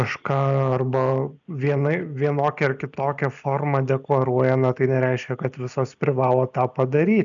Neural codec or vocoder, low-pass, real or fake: none; 7.2 kHz; real